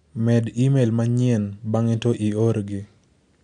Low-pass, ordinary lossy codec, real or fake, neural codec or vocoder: 9.9 kHz; MP3, 96 kbps; real; none